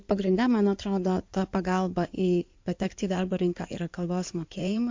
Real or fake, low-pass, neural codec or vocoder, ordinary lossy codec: fake; 7.2 kHz; codec, 16 kHz in and 24 kHz out, 2.2 kbps, FireRedTTS-2 codec; MP3, 48 kbps